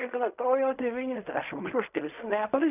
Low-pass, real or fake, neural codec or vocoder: 3.6 kHz; fake; codec, 16 kHz in and 24 kHz out, 0.4 kbps, LongCat-Audio-Codec, fine tuned four codebook decoder